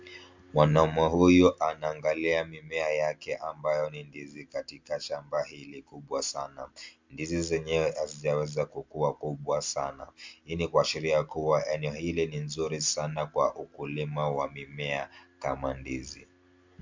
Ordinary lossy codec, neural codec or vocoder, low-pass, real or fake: MP3, 64 kbps; none; 7.2 kHz; real